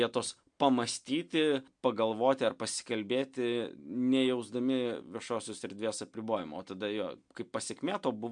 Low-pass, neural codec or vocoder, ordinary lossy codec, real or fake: 9.9 kHz; none; MP3, 64 kbps; real